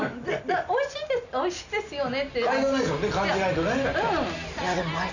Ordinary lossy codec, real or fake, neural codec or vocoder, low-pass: none; real; none; 7.2 kHz